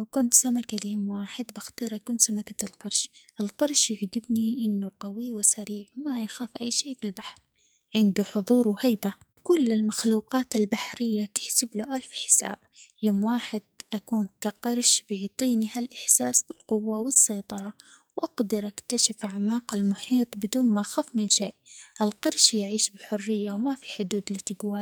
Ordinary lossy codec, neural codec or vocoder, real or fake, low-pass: none; codec, 44.1 kHz, 2.6 kbps, SNAC; fake; none